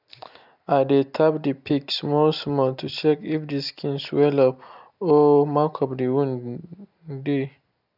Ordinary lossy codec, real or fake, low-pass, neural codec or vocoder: none; real; 5.4 kHz; none